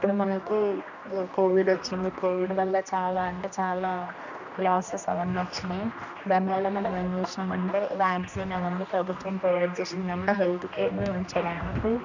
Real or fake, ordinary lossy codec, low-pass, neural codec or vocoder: fake; none; 7.2 kHz; codec, 16 kHz, 1 kbps, X-Codec, HuBERT features, trained on general audio